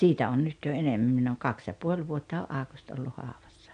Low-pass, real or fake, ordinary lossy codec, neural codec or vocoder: 9.9 kHz; real; none; none